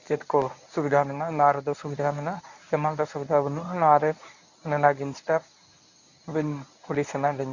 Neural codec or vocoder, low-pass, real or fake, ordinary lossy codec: codec, 24 kHz, 0.9 kbps, WavTokenizer, medium speech release version 1; 7.2 kHz; fake; none